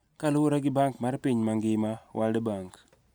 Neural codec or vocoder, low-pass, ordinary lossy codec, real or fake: none; none; none; real